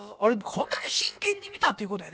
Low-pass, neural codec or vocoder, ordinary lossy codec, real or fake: none; codec, 16 kHz, about 1 kbps, DyCAST, with the encoder's durations; none; fake